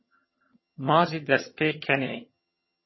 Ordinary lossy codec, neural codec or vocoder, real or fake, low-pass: MP3, 24 kbps; vocoder, 22.05 kHz, 80 mel bands, HiFi-GAN; fake; 7.2 kHz